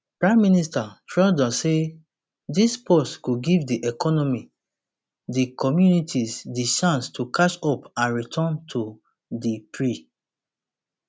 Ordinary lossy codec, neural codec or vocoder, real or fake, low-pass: none; none; real; none